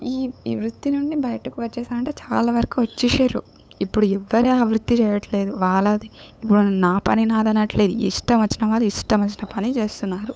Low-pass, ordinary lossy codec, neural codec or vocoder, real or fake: none; none; codec, 16 kHz, 8 kbps, FunCodec, trained on LibriTTS, 25 frames a second; fake